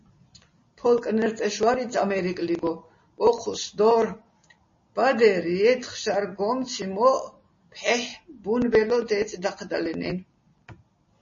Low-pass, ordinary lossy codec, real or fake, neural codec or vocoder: 7.2 kHz; MP3, 32 kbps; real; none